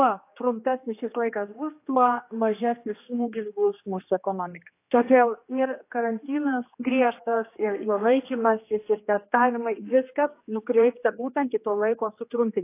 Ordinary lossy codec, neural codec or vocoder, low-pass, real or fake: AAC, 24 kbps; codec, 16 kHz, 2 kbps, X-Codec, HuBERT features, trained on general audio; 3.6 kHz; fake